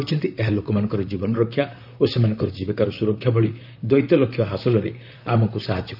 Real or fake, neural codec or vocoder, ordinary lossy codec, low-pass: fake; vocoder, 44.1 kHz, 128 mel bands, Pupu-Vocoder; none; 5.4 kHz